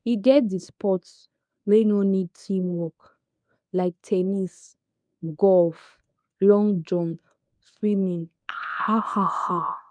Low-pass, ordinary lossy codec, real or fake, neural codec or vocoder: 9.9 kHz; none; fake; codec, 24 kHz, 0.9 kbps, WavTokenizer, medium speech release version 1